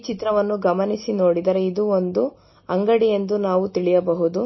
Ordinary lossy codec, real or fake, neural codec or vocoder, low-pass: MP3, 24 kbps; real; none; 7.2 kHz